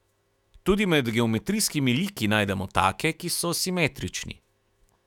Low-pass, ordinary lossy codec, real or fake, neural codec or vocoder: 19.8 kHz; none; fake; autoencoder, 48 kHz, 128 numbers a frame, DAC-VAE, trained on Japanese speech